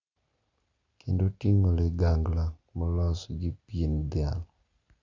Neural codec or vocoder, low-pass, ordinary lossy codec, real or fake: none; 7.2 kHz; Opus, 64 kbps; real